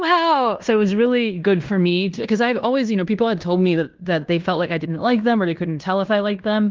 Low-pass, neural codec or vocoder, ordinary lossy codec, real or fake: 7.2 kHz; codec, 16 kHz in and 24 kHz out, 0.9 kbps, LongCat-Audio-Codec, fine tuned four codebook decoder; Opus, 32 kbps; fake